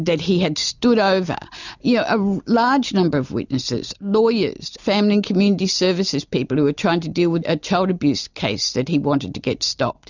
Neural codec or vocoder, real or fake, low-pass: none; real; 7.2 kHz